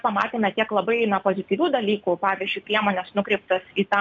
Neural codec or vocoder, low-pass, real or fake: none; 7.2 kHz; real